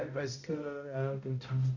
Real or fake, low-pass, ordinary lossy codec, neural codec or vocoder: fake; 7.2 kHz; none; codec, 16 kHz, 0.5 kbps, X-Codec, HuBERT features, trained on balanced general audio